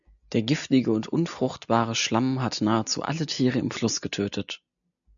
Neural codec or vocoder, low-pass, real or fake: none; 7.2 kHz; real